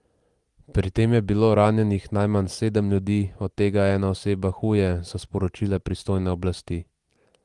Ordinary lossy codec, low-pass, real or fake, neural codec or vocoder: Opus, 24 kbps; 10.8 kHz; real; none